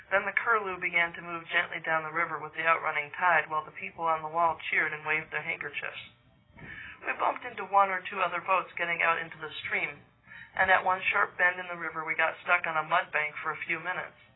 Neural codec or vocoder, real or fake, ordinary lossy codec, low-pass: none; real; AAC, 16 kbps; 7.2 kHz